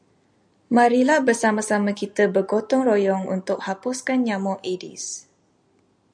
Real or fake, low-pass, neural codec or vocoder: real; 9.9 kHz; none